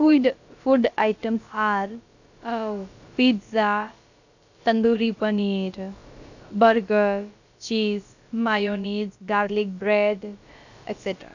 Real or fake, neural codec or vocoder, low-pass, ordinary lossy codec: fake; codec, 16 kHz, about 1 kbps, DyCAST, with the encoder's durations; 7.2 kHz; none